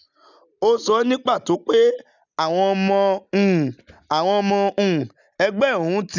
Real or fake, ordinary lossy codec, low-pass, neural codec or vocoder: real; none; 7.2 kHz; none